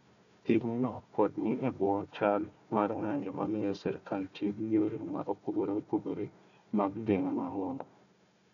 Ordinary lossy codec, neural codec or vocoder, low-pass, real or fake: none; codec, 16 kHz, 1 kbps, FunCodec, trained on Chinese and English, 50 frames a second; 7.2 kHz; fake